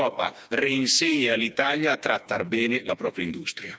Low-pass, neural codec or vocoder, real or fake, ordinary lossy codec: none; codec, 16 kHz, 2 kbps, FreqCodec, smaller model; fake; none